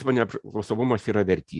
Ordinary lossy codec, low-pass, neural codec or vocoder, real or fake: Opus, 64 kbps; 10.8 kHz; none; real